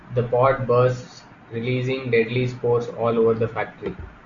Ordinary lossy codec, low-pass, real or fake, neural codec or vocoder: Opus, 64 kbps; 7.2 kHz; real; none